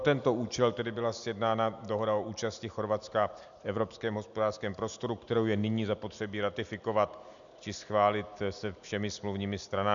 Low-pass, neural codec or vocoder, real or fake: 7.2 kHz; none; real